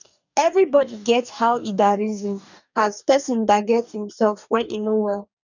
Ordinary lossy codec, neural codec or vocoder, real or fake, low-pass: none; codec, 44.1 kHz, 2.6 kbps, DAC; fake; 7.2 kHz